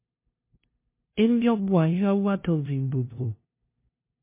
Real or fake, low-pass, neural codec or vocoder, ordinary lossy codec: fake; 3.6 kHz; codec, 16 kHz, 0.5 kbps, FunCodec, trained on LibriTTS, 25 frames a second; MP3, 24 kbps